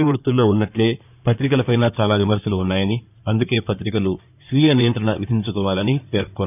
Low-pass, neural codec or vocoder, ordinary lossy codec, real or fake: 3.6 kHz; codec, 16 kHz in and 24 kHz out, 2.2 kbps, FireRedTTS-2 codec; none; fake